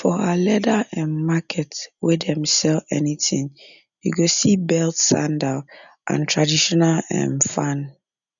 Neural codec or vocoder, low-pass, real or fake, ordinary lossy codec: none; 7.2 kHz; real; none